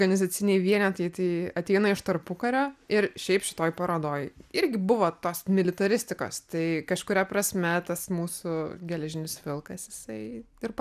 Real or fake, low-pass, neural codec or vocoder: real; 14.4 kHz; none